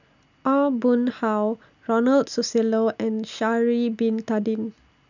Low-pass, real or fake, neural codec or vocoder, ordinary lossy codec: 7.2 kHz; real; none; none